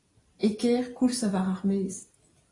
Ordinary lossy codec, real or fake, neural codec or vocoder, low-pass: AAC, 32 kbps; fake; vocoder, 44.1 kHz, 128 mel bands every 256 samples, BigVGAN v2; 10.8 kHz